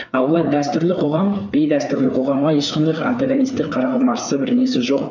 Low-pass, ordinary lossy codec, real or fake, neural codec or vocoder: 7.2 kHz; none; fake; codec, 16 kHz, 4 kbps, FreqCodec, larger model